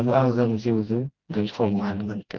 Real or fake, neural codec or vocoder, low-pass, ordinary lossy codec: fake; codec, 16 kHz, 1 kbps, FreqCodec, smaller model; 7.2 kHz; Opus, 32 kbps